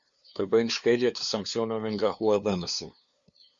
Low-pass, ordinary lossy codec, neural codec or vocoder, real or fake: 7.2 kHz; Opus, 64 kbps; codec, 16 kHz, 2 kbps, FunCodec, trained on LibriTTS, 25 frames a second; fake